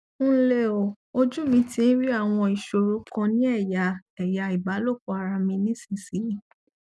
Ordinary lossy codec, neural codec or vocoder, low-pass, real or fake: none; none; none; real